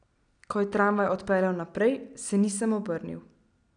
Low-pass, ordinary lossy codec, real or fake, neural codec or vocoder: 9.9 kHz; none; real; none